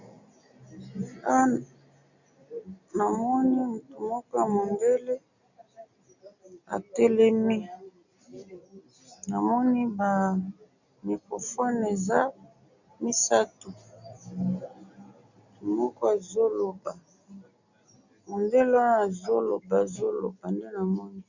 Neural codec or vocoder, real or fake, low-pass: none; real; 7.2 kHz